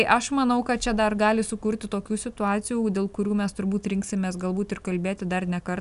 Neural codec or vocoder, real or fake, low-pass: none; real; 10.8 kHz